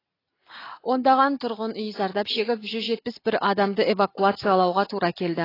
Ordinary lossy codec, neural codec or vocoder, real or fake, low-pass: AAC, 24 kbps; none; real; 5.4 kHz